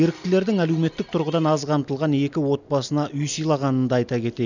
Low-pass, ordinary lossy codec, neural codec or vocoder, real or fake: 7.2 kHz; none; none; real